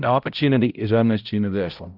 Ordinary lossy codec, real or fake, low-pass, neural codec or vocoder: Opus, 32 kbps; fake; 5.4 kHz; codec, 16 kHz, 0.5 kbps, X-Codec, HuBERT features, trained on balanced general audio